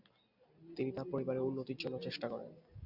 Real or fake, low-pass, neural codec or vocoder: real; 5.4 kHz; none